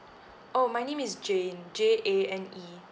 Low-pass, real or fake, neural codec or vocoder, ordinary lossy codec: none; real; none; none